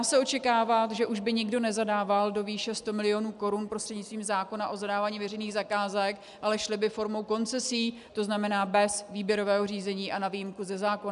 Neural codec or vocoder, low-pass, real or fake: none; 10.8 kHz; real